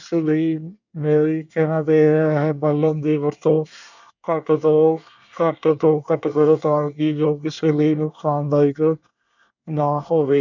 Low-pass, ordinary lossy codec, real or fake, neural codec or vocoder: 7.2 kHz; none; fake; codec, 24 kHz, 1 kbps, SNAC